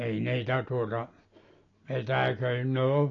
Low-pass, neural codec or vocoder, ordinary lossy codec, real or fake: 7.2 kHz; none; AAC, 32 kbps; real